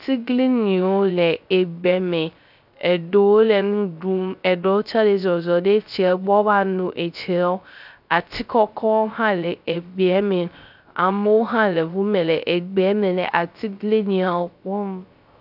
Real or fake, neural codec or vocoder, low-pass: fake; codec, 16 kHz, 0.3 kbps, FocalCodec; 5.4 kHz